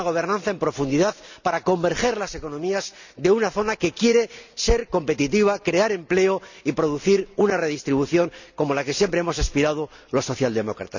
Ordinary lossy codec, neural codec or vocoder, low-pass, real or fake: none; none; 7.2 kHz; real